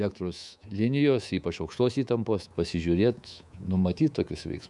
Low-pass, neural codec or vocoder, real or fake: 10.8 kHz; codec, 24 kHz, 3.1 kbps, DualCodec; fake